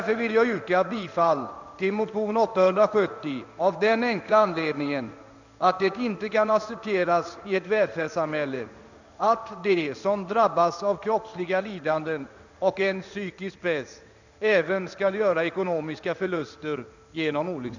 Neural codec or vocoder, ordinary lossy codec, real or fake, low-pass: codec, 16 kHz in and 24 kHz out, 1 kbps, XY-Tokenizer; none; fake; 7.2 kHz